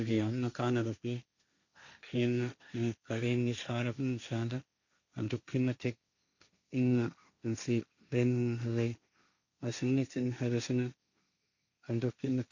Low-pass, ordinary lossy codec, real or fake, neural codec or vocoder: 7.2 kHz; none; fake; codec, 16 kHz, 1.1 kbps, Voila-Tokenizer